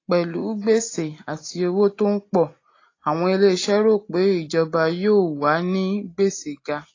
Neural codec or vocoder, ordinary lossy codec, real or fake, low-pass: none; AAC, 32 kbps; real; 7.2 kHz